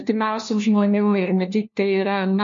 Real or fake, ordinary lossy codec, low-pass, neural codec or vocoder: fake; MP3, 48 kbps; 7.2 kHz; codec, 16 kHz, 1 kbps, FunCodec, trained on LibriTTS, 50 frames a second